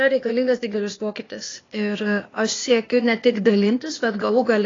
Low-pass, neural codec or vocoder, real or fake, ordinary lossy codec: 7.2 kHz; codec, 16 kHz, 0.8 kbps, ZipCodec; fake; AAC, 32 kbps